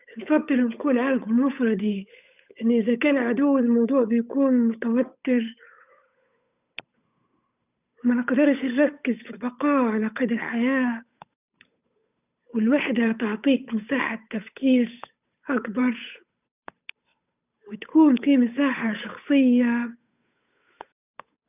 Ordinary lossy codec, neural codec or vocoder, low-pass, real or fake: none; codec, 16 kHz, 8 kbps, FunCodec, trained on Chinese and English, 25 frames a second; 3.6 kHz; fake